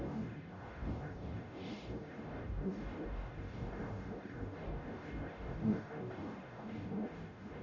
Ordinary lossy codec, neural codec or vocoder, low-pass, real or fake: none; codec, 44.1 kHz, 0.9 kbps, DAC; 7.2 kHz; fake